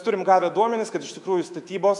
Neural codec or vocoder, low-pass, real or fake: autoencoder, 48 kHz, 128 numbers a frame, DAC-VAE, trained on Japanese speech; 10.8 kHz; fake